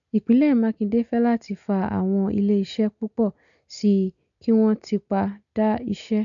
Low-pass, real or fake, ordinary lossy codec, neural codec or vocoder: 7.2 kHz; real; none; none